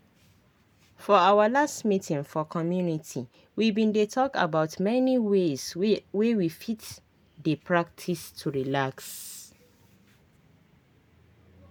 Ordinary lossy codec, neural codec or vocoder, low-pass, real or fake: none; none; none; real